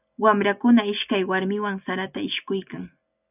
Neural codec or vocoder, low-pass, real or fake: none; 3.6 kHz; real